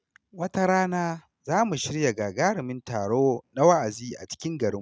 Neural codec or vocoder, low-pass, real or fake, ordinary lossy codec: none; none; real; none